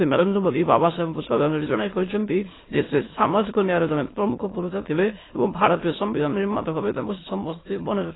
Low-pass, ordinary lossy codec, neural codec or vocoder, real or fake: 7.2 kHz; AAC, 16 kbps; autoencoder, 22.05 kHz, a latent of 192 numbers a frame, VITS, trained on many speakers; fake